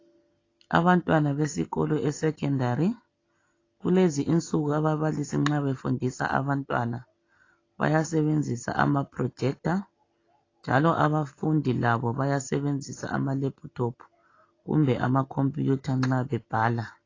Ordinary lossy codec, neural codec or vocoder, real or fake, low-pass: AAC, 32 kbps; none; real; 7.2 kHz